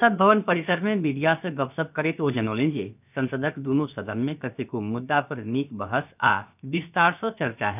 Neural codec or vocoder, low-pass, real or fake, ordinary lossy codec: codec, 16 kHz, about 1 kbps, DyCAST, with the encoder's durations; 3.6 kHz; fake; none